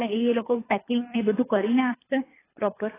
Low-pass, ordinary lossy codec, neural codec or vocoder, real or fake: 3.6 kHz; AAC, 16 kbps; codec, 24 kHz, 3 kbps, HILCodec; fake